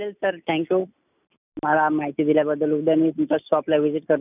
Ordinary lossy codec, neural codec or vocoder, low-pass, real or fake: none; none; 3.6 kHz; real